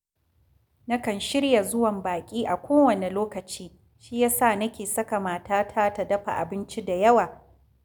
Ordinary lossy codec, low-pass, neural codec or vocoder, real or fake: none; none; none; real